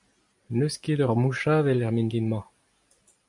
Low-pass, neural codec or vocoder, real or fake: 10.8 kHz; none; real